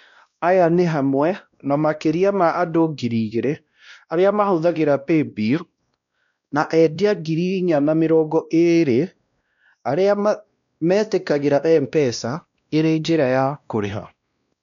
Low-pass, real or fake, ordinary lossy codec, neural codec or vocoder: 7.2 kHz; fake; none; codec, 16 kHz, 1 kbps, X-Codec, WavLM features, trained on Multilingual LibriSpeech